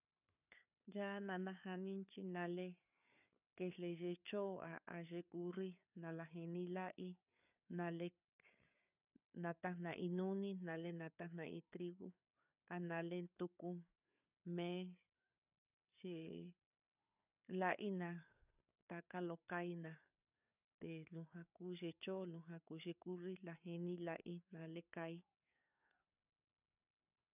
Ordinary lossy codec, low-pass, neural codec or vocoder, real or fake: none; 3.6 kHz; codec, 16 kHz, 4 kbps, FreqCodec, larger model; fake